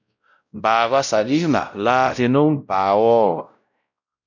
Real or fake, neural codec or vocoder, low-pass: fake; codec, 16 kHz, 0.5 kbps, X-Codec, WavLM features, trained on Multilingual LibriSpeech; 7.2 kHz